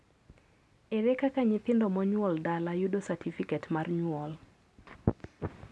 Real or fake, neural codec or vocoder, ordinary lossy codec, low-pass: real; none; none; none